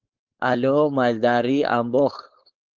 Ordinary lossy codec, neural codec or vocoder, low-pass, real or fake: Opus, 32 kbps; codec, 16 kHz, 4.8 kbps, FACodec; 7.2 kHz; fake